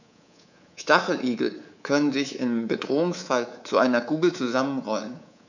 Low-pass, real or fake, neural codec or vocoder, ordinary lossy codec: 7.2 kHz; fake; codec, 24 kHz, 3.1 kbps, DualCodec; none